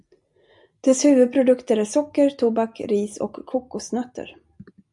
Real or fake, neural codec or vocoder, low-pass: real; none; 10.8 kHz